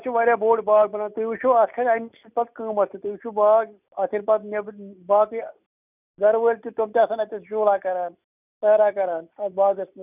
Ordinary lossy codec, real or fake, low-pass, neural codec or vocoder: none; real; 3.6 kHz; none